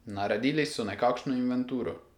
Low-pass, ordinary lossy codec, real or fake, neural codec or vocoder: 19.8 kHz; none; real; none